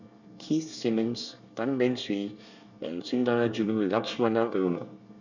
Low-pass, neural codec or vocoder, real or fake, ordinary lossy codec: 7.2 kHz; codec, 24 kHz, 1 kbps, SNAC; fake; none